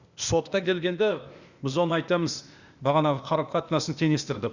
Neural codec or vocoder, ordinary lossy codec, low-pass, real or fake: codec, 16 kHz, 0.8 kbps, ZipCodec; Opus, 64 kbps; 7.2 kHz; fake